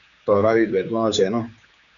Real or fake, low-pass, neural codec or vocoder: fake; 7.2 kHz; codec, 16 kHz, 4 kbps, X-Codec, HuBERT features, trained on general audio